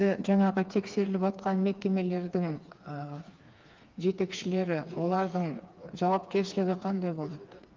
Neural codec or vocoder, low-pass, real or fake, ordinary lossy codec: codec, 16 kHz, 4 kbps, FreqCodec, smaller model; 7.2 kHz; fake; Opus, 24 kbps